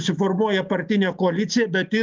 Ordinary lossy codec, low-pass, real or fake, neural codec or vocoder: Opus, 24 kbps; 7.2 kHz; real; none